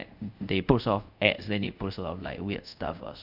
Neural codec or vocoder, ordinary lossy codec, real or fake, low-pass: codec, 24 kHz, 0.5 kbps, DualCodec; none; fake; 5.4 kHz